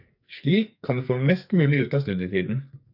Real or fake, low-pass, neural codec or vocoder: fake; 5.4 kHz; codec, 44.1 kHz, 2.6 kbps, SNAC